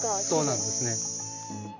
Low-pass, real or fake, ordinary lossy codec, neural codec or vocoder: 7.2 kHz; real; none; none